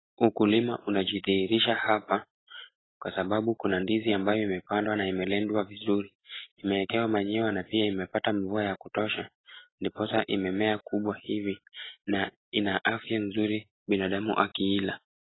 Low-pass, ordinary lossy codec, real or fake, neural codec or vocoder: 7.2 kHz; AAC, 16 kbps; real; none